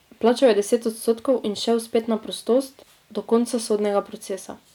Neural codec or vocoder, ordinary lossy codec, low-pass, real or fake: none; none; 19.8 kHz; real